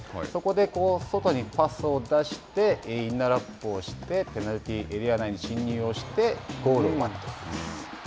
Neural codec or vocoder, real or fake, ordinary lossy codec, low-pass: none; real; none; none